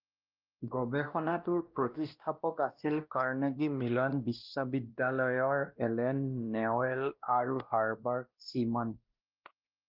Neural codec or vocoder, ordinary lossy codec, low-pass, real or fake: codec, 16 kHz, 1 kbps, X-Codec, WavLM features, trained on Multilingual LibriSpeech; Opus, 32 kbps; 5.4 kHz; fake